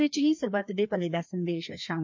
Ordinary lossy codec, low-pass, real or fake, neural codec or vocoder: MP3, 48 kbps; 7.2 kHz; fake; codec, 16 kHz, 2 kbps, FreqCodec, larger model